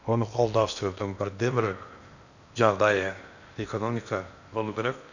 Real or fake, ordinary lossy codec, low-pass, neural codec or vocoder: fake; none; 7.2 kHz; codec, 16 kHz in and 24 kHz out, 0.8 kbps, FocalCodec, streaming, 65536 codes